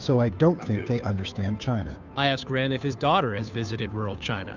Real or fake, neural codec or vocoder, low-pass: fake; codec, 16 kHz, 2 kbps, FunCodec, trained on Chinese and English, 25 frames a second; 7.2 kHz